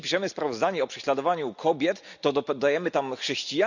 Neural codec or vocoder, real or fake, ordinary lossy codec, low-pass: none; real; none; 7.2 kHz